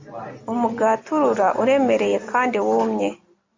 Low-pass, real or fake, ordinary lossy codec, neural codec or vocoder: 7.2 kHz; real; MP3, 64 kbps; none